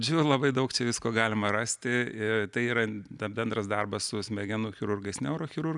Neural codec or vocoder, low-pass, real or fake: none; 10.8 kHz; real